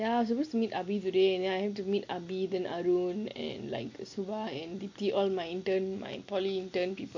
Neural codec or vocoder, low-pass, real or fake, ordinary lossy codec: none; 7.2 kHz; real; MP3, 48 kbps